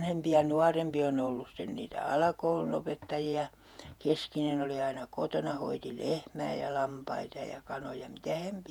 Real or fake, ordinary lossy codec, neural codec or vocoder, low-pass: fake; none; vocoder, 48 kHz, 128 mel bands, Vocos; 19.8 kHz